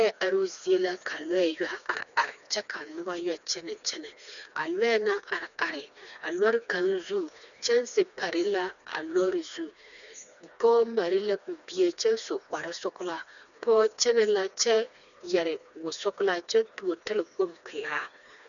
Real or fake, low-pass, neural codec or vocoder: fake; 7.2 kHz; codec, 16 kHz, 2 kbps, FreqCodec, smaller model